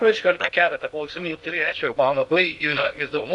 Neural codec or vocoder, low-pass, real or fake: codec, 16 kHz in and 24 kHz out, 0.6 kbps, FocalCodec, streaming, 2048 codes; 10.8 kHz; fake